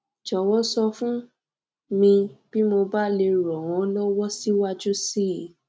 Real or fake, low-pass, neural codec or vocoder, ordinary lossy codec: real; none; none; none